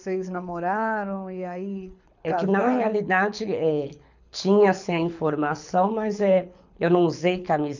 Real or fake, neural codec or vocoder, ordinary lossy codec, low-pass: fake; codec, 24 kHz, 6 kbps, HILCodec; none; 7.2 kHz